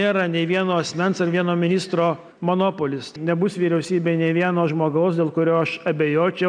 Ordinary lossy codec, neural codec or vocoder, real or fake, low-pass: MP3, 96 kbps; none; real; 9.9 kHz